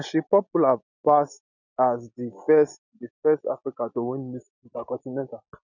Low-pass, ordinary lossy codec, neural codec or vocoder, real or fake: 7.2 kHz; none; none; real